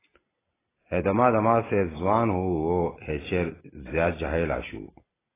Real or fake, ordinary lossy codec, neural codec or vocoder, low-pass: real; AAC, 16 kbps; none; 3.6 kHz